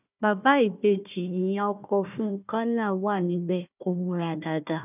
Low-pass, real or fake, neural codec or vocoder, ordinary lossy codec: 3.6 kHz; fake; codec, 16 kHz, 1 kbps, FunCodec, trained on Chinese and English, 50 frames a second; none